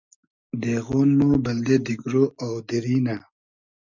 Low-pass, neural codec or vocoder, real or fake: 7.2 kHz; none; real